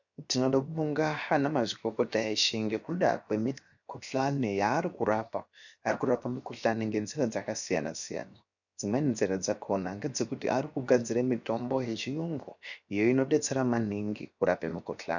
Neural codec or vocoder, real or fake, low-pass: codec, 16 kHz, 0.7 kbps, FocalCodec; fake; 7.2 kHz